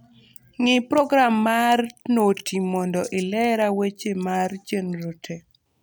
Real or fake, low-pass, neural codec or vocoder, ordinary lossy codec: real; none; none; none